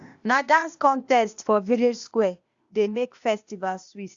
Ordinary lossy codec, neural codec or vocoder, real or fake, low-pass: Opus, 64 kbps; codec, 16 kHz, 0.8 kbps, ZipCodec; fake; 7.2 kHz